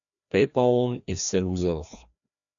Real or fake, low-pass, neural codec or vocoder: fake; 7.2 kHz; codec, 16 kHz, 1 kbps, FreqCodec, larger model